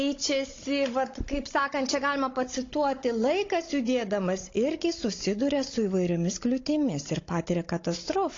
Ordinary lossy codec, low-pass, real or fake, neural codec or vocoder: AAC, 32 kbps; 7.2 kHz; fake; codec, 16 kHz, 16 kbps, FunCodec, trained on Chinese and English, 50 frames a second